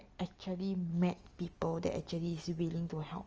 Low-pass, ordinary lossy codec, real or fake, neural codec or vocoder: 7.2 kHz; Opus, 24 kbps; real; none